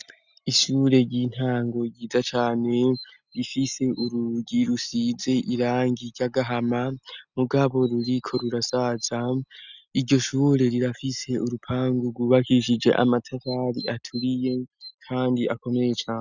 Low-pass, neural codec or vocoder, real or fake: 7.2 kHz; none; real